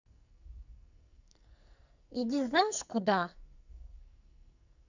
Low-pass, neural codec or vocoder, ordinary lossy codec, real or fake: 7.2 kHz; codec, 44.1 kHz, 2.6 kbps, SNAC; none; fake